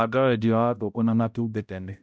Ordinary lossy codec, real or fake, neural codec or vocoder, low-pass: none; fake; codec, 16 kHz, 0.5 kbps, X-Codec, HuBERT features, trained on balanced general audio; none